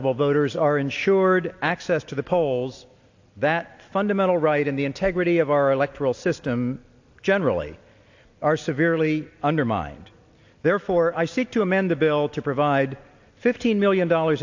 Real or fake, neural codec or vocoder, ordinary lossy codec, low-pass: real; none; AAC, 48 kbps; 7.2 kHz